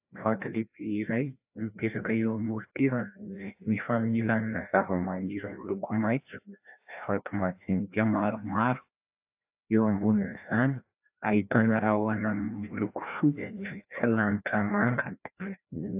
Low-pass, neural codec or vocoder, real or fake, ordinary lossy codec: 3.6 kHz; codec, 16 kHz, 1 kbps, FreqCodec, larger model; fake; AAC, 32 kbps